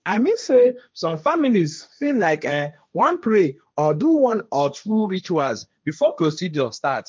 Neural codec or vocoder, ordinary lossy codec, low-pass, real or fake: codec, 16 kHz, 1.1 kbps, Voila-Tokenizer; none; none; fake